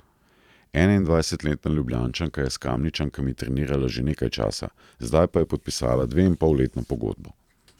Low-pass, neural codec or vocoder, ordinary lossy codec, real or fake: 19.8 kHz; vocoder, 44.1 kHz, 128 mel bands every 512 samples, BigVGAN v2; none; fake